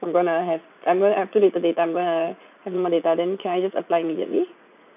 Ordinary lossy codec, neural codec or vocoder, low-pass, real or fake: none; vocoder, 44.1 kHz, 80 mel bands, Vocos; 3.6 kHz; fake